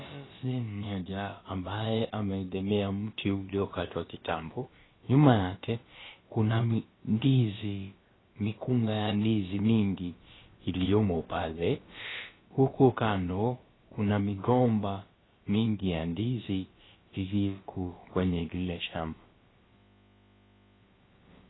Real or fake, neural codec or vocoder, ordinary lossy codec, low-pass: fake; codec, 16 kHz, about 1 kbps, DyCAST, with the encoder's durations; AAC, 16 kbps; 7.2 kHz